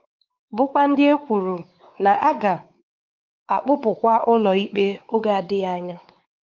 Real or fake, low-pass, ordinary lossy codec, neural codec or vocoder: fake; 7.2 kHz; Opus, 32 kbps; codec, 16 kHz, 4 kbps, X-Codec, WavLM features, trained on Multilingual LibriSpeech